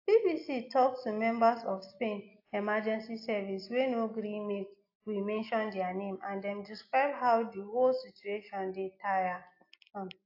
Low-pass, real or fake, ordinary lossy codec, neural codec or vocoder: 5.4 kHz; real; AAC, 32 kbps; none